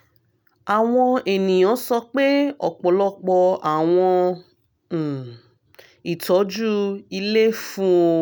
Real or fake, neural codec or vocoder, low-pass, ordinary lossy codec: real; none; none; none